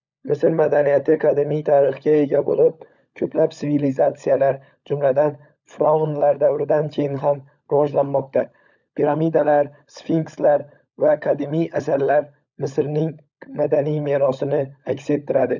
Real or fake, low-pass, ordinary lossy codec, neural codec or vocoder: fake; 7.2 kHz; none; codec, 16 kHz, 16 kbps, FunCodec, trained on LibriTTS, 50 frames a second